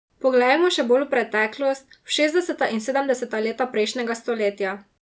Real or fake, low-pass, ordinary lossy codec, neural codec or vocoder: real; none; none; none